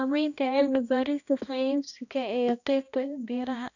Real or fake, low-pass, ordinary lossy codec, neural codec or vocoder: fake; 7.2 kHz; none; codec, 16 kHz, 1 kbps, X-Codec, HuBERT features, trained on balanced general audio